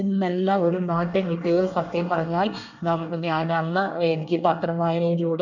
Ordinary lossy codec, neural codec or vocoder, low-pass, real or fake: none; codec, 24 kHz, 1 kbps, SNAC; 7.2 kHz; fake